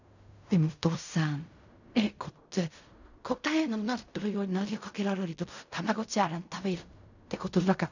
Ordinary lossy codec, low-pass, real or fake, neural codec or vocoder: none; 7.2 kHz; fake; codec, 16 kHz in and 24 kHz out, 0.4 kbps, LongCat-Audio-Codec, fine tuned four codebook decoder